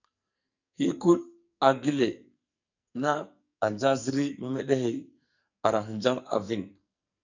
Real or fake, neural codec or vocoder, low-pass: fake; codec, 44.1 kHz, 2.6 kbps, SNAC; 7.2 kHz